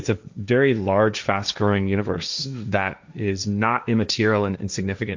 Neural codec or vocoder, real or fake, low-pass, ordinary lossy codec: codec, 16 kHz, 1.1 kbps, Voila-Tokenizer; fake; 7.2 kHz; MP3, 64 kbps